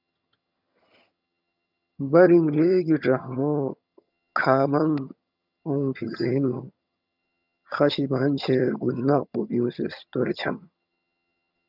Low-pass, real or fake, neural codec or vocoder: 5.4 kHz; fake; vocoder, 22.05 kHz, 80 mel bands, HiFi-GAN